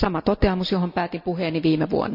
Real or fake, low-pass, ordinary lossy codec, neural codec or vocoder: real; 5.4 kHz; none; none